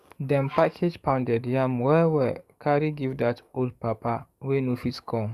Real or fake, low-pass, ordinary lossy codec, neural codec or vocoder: fake; 14.4 kHz; Opus, 64 kbps; codec, 44.1 kHz, 7.8 kbps, DAC